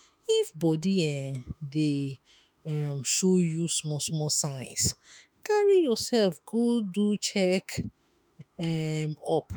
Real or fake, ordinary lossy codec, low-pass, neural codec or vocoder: fake; none; none; autoencoder, 48 kHz, 32 numbers a frame, DAC-VAE, trained on Japanese speech